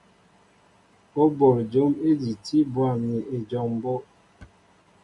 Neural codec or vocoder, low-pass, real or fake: none; 10.8 kHz; real